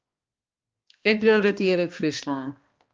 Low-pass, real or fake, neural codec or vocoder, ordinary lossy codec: 7.2 kHz; fake; codec, 16 kHz, 1 kbps, X-Codec, HuBERT features, trained on balanced general audio; Opus, 24 kbps